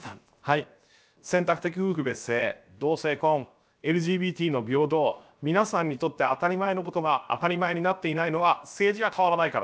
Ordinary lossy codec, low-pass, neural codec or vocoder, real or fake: none; none; codec, 16 kHz, 0.7 kbps, FocalCodec; fake